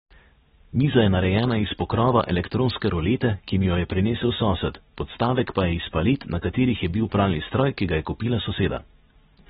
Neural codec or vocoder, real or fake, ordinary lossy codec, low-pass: none; real; AAC, 16 kbps; 10.8 kHz